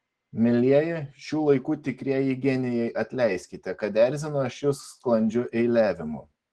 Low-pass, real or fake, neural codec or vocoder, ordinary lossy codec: 10.8 kHz; real; none; Opus, 16 kbps